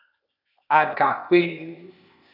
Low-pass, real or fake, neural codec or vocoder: 5.4 kHz; fake; codec, 16 kHz, 0.8 kbps, ZipCodec